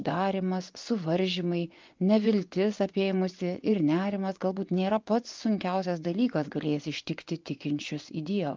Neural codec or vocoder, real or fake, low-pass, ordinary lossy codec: vocoder, 24 kHz, 100 mel bands, Vocos; fake; 7.2 kHz; Opus, 24 kbps